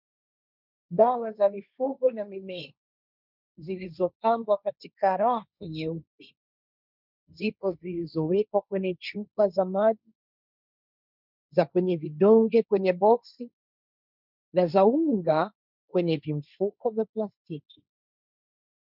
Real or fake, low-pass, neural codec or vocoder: fake; 5.4 kHz; codec, 16 kHz, 1.1 kbps, Voila-Tokenizer